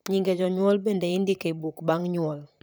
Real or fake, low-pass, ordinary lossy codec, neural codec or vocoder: fake; none; none; vocoder, 44.1 kHz, 128 mel bands, Pupu-Vocoder